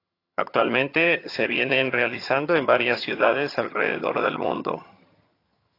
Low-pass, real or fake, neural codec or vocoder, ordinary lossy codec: 5.4 kHz; fake; vocoder, 22.05 kHz, 80 mel bands, HiFi-GAN; AAC, 32 kbps